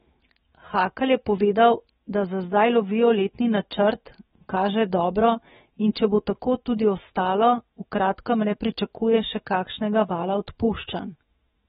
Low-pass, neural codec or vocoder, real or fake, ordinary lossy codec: 19.8 kHz; autoencoder, 48 kHz, 128 numbers a frame, DAC-VAE, trained on Japanese speech; fake; AAC, 16 kbps